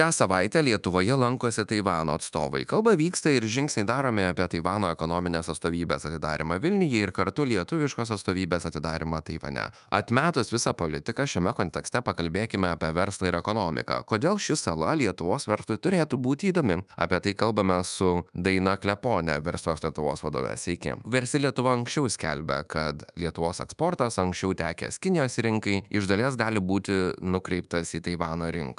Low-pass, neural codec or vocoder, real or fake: 10.8 kHz; codec, 24 kHz, 1.2 kbps, DualCodec; fake